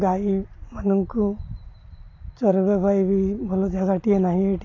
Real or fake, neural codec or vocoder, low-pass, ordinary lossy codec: real; none; 7.2 kHz; AAC, 32 kbps